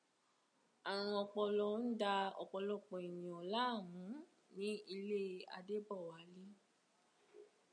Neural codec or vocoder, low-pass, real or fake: none; 9.9 kHz; real